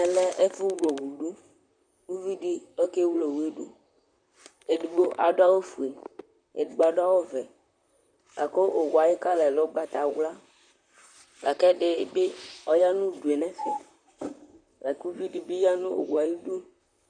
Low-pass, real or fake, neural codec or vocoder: 9.9 kHz; fake; vocoder, 44.1 kHz, 128 mel bands, Pupu-Vocoder